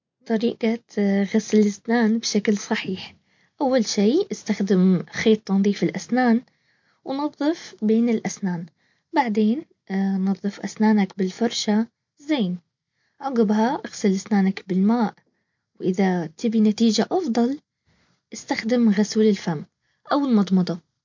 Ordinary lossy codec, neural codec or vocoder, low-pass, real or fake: MP3, 48 kbps; none; 7.2 kHz; real